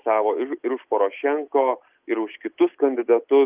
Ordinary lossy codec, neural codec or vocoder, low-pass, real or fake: Opus, 24 kbps; none; 3.6 kHz; real